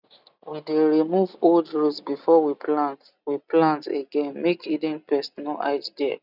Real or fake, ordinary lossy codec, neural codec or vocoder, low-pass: real; none; none; 5.4 kHz